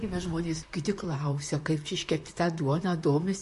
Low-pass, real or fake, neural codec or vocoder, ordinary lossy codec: 14.4 kHz; fake; vocoder, 44.1 kHz, 128 mel bands, Pupu-Vocoder; MP3, 48 kbps